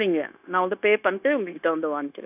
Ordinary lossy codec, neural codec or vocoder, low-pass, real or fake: none; codec, 16 kHz, 0.9 kbps, LongCat-Audio-Codec; 3.6 kHz; fake